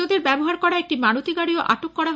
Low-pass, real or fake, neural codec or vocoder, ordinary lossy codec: none; real; none; none